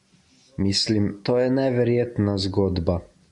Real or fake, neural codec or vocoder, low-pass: real; none; 10.8 kHz